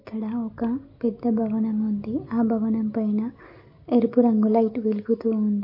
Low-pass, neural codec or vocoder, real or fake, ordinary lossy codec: 5.4 kHz; none; real; MP3, 32 kbps